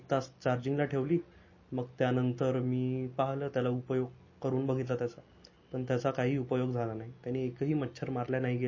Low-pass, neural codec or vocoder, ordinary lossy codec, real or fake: 7.2 kHz; none; MP3, 32 kbps; real